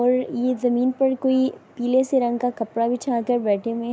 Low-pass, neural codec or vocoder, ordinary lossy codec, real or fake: none; none; none; real